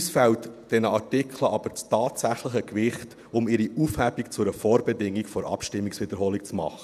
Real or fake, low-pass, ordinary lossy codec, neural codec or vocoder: fake; 14.4 kHz; none; vocoder, 48 kHz, 128 mel bands, Vocos